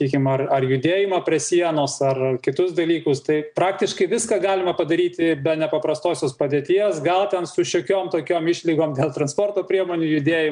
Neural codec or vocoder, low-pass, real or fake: none; 9.9 kHz; real